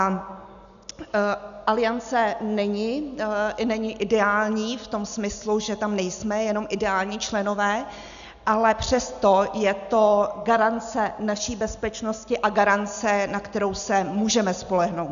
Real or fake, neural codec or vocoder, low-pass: real; none; 7.2 kHz